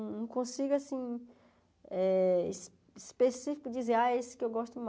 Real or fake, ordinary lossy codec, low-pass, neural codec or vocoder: real; none; none; none